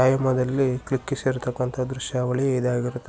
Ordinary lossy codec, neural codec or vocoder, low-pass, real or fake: none; none; none; real